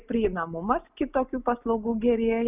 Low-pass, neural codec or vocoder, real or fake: 3.6 kHz; none; real